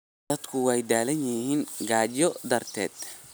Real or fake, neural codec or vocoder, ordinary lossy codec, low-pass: real; none; none; none